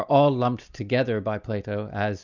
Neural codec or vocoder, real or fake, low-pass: none; real; 7.2 kHz